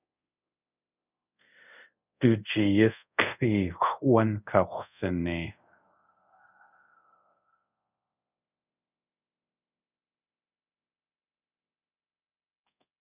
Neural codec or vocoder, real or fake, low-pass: codec, 24 kHz, 0.5 kbps, DualCodec; fake; 3.6 kHz